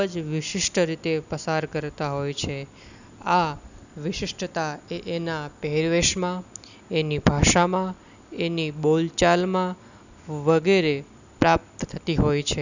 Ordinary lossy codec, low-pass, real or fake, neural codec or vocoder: none; 7.2 kHz; real; none